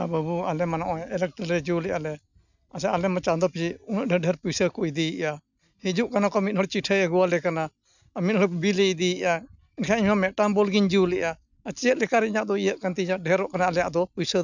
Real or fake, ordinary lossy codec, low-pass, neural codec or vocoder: real; none; 7.2 kHz; none